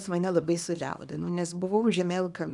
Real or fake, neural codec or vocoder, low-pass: fake; codec, 24 kHz, 0.9 kbps, WavTokenizer, small release; 10.8 kHz